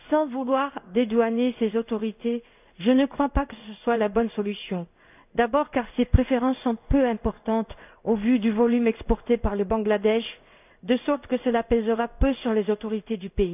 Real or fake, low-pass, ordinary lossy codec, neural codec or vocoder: fake; 3.6 kHz; none; codec, 16 kHz in and 24 kHz out, 1 kbps, XY-Tokenizer